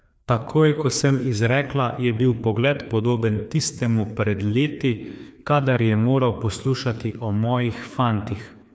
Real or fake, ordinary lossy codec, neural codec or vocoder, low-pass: fake; none; codec, 16 kHz, 2 kbps, FreqCodec, larger model; none